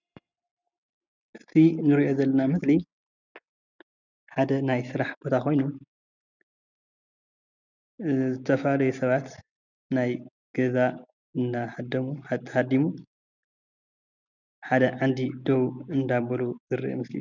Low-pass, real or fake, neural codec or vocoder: 7.2 kHz; real; none